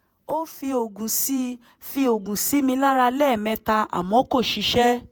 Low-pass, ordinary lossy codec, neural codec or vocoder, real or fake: none; none; vocoder, 48 kHz, 128 mel bands, Vocos; fake